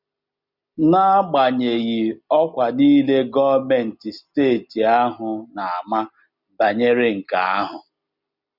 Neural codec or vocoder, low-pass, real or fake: none; 5.4 kHz; real